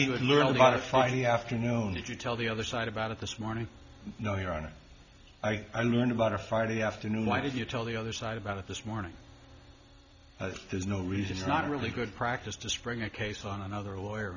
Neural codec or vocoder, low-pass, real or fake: none; 7.2 kHz; real